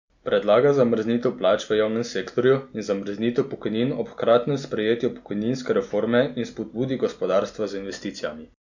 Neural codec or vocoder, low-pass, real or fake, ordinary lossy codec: none; 7.2 kHz; real; none